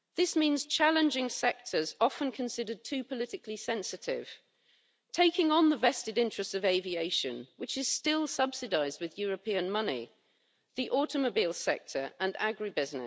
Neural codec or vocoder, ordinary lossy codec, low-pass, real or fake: none; none; none; real